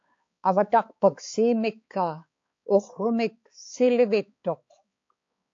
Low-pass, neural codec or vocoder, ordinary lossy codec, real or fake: 7.2 kHz; codec, 16 kHz, 4 kbps, X-Codec, HuBERT features, trained on balanced general audio; AAC, 48 kbps; fake